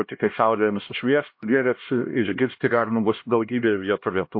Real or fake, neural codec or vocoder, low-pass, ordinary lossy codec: fake; codec, 16 kHz, 1 kbps, X-Codec, HuBERT features, trained on LibriSpeech; 5.4 kHz; MP3, 32 kbps